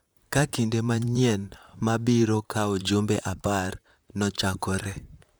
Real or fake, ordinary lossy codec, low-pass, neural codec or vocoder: fake; none; none; vocoder, 44.1 kHz, 128 mel bands, Pupu-Vocoder